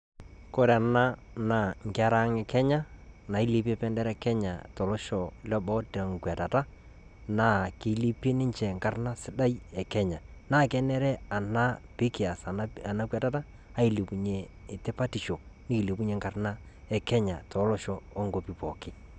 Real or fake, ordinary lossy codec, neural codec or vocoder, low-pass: real; none; none; 9.9 kHz